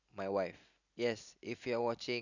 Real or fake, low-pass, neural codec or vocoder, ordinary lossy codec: real; 7.2 kHz; none; none